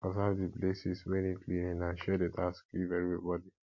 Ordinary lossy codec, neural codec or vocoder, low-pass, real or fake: MP3, 48 kbps; none; 7.2 kHz; real